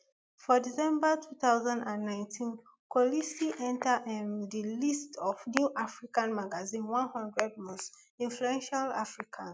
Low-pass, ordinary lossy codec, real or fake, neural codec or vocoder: none; none; real; none